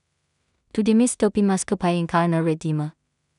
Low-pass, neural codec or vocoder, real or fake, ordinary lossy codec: 10.8 kHz; codec, 16 kHz in and 24 kHz out, 0.4 kbps, LongCat-Audio-Codec, two codebook decoder; fake; none